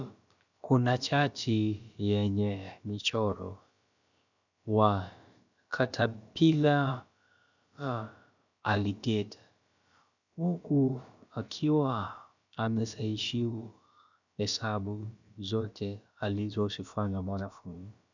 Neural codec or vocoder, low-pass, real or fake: codec, 16 kHz, about 1 kbps, DyCAST, with the encoder's durations; 7.2 kHz; fake